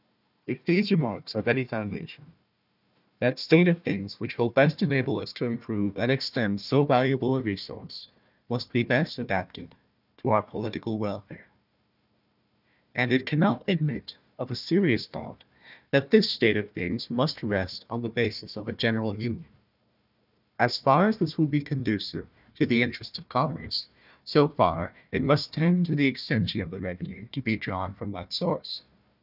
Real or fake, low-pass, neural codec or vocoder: fake; 5.4 kHz; codec, 16 kHz, 1 kbps, FunCodec, trained on Chinese and English, 50 frames a second